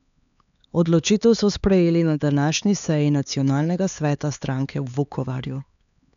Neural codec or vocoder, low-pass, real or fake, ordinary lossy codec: codec, 16 kHz, 4 kbps, X-Codec, HuBERT features, trained on LibriSpeech; 7.2 kHz; fake; none